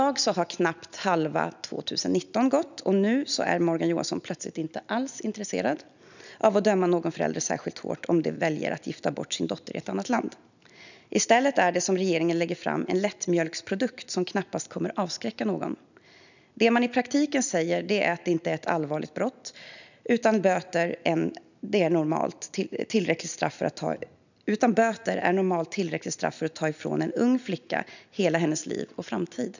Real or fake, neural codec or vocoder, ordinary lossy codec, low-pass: real; none; none; 7.2 kHz